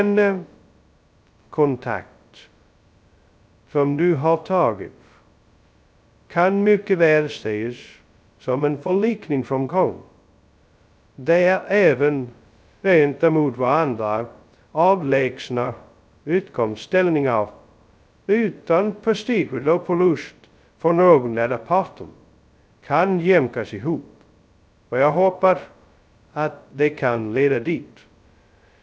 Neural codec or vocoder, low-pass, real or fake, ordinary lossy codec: codec, 16 kHz, 0.2 kbps, FocalCodec; none; fake; none